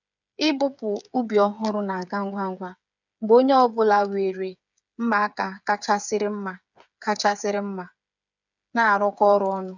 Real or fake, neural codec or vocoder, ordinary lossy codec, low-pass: fake; codec, 16 kHz, 8 kbps, FreqCodec, smaller model; none; 7.2 kHz